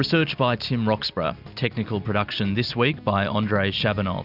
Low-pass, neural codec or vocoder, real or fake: 5.4 kHz; none; real